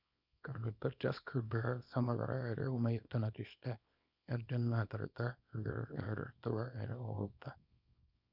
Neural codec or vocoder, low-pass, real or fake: codec, 24 kHz, 0.9 kbps, WavTokenizer, small release; 5.4 kHz; fake